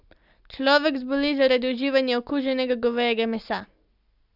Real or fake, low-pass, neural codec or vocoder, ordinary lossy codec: real; 5.4 kHz; none; none